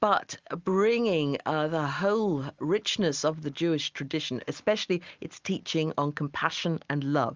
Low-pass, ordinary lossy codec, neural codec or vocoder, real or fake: 7.2 kHz; Opus, 32 kbps; none; real